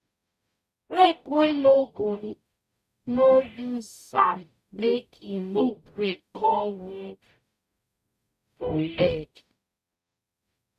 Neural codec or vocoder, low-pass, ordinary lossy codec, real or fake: codec, 44.1 kHz, 0.9 kbps, DAC; 14.4 kHz; MP3, 96 kbps; fake